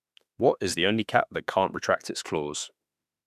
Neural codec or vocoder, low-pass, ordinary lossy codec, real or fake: autoencoder, 48 kHz, 32 numbers a frame, DAC-VAE, trained on Japanese speech; 14.4 kHz; none; fake